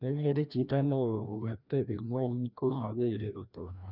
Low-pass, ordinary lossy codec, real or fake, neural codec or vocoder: 5.4 kHz; none; fake; codec, 16 kHz, 1 kbps, FreqCodec, larger model